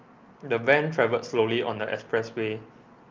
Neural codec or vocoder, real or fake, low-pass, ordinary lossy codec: none; real; 7.2 kHz; Opus, 32 kbps